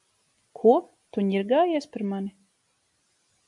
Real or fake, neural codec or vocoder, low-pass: real; none; 10.8 kHz